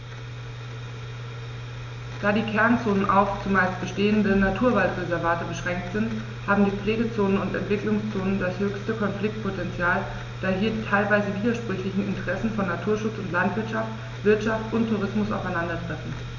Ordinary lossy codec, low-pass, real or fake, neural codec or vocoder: none; 7.2 kHz; real; none